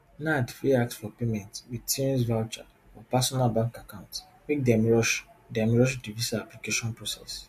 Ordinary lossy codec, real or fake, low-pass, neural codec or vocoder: MP3, 64 kbps; real; 14.4 kHz; none